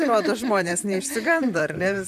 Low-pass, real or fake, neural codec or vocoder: 14.4 kHz; fake; vocoder, 44.1 kHz, 128 mel bands, Pupu-Vocoder